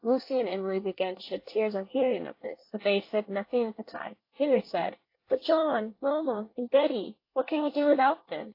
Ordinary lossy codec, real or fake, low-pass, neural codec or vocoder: AAC, 32 kbps; fake; 5.4 kHz; codec, 24 kHz, 1 kbps, SNAC